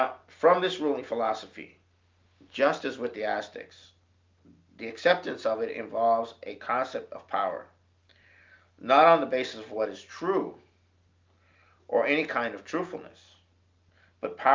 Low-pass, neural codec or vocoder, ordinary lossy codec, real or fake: 7.2 kHz; none; Opus, 24 kbps; real